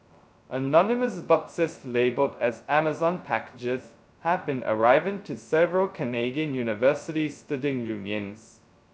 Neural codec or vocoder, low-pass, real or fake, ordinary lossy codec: codec, 16 kHz, 0.2 kbps, FocalCodec; none; fake; none